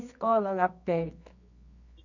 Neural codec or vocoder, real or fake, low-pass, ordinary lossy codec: codec, 24 kHz, 0.9 kbps, WavTokenizer, medium music audio release; fake; 7.2 kHz; none